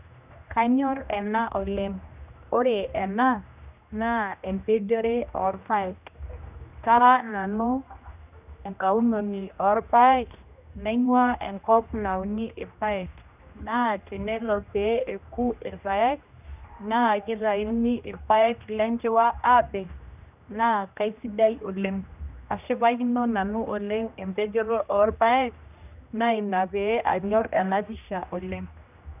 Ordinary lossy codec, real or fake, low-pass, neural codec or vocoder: none; fake; 3.6 kHz; codec, 16 kHz, 1 kbps, X-Codec, HuBERT features, trained on general audio